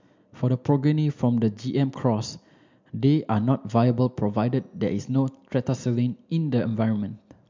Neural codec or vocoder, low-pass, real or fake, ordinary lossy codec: none; 7.2 kHz; real; MP3, 64 kbps